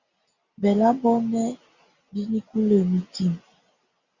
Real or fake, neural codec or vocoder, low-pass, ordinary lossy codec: real; none; 7.2 kHz; Opus, 64 kbps